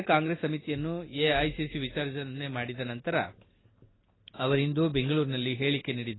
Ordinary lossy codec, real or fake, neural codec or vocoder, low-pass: AAC, 16 kbps; real; none; 7.2 kHz